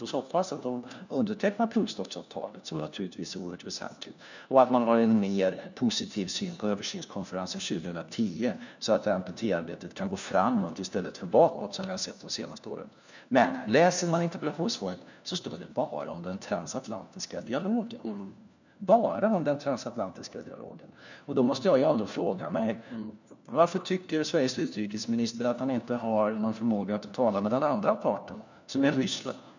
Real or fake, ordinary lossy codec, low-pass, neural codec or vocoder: fake; none; 7.2 kHz; codec, 16 kHz, 1 kbps, FunCodec, trained on LibriTTS, 50 frames a second